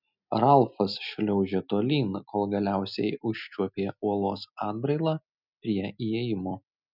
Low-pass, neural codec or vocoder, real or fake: 5.4 kHz; none; real